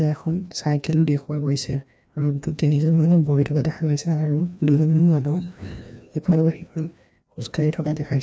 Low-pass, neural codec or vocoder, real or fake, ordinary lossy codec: none; codec, 16 kHz, 1 kbps, FreqCodec, larger model; fake; none